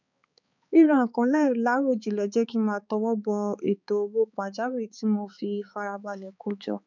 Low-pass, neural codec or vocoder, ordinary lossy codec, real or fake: 7.2 kHz; codec, 16 kHz, 4 kbps, X-Codec, HuBERT features, trained on balanced general audio; none; fake